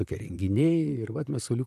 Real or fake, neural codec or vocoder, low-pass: fake; vocoder, 44.1 kHz, 128 mel bands, Pupu-Vocoder; 14.4 kHz